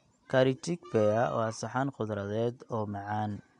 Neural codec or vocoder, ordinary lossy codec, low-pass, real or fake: none; MP3, 64 kbps; 10.8 kHz; real